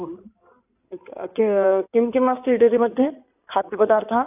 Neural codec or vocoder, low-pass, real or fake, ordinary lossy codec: codec, 16 kHz in and 24 kHz out, 2.2 kbps, FireRedTTS-2 codec; 3.6 kHz; fake; AAC, 32 kbps